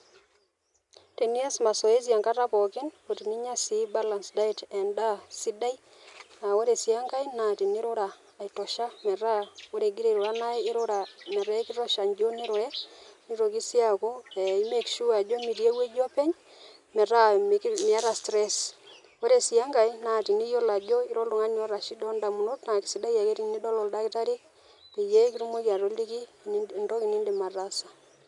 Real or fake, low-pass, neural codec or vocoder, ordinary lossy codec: real; 10.8 kHz; none; none